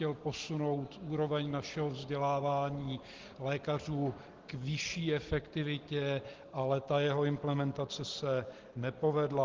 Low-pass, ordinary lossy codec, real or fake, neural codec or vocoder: 7.2 kHz; Opus, 16 kbps; real; none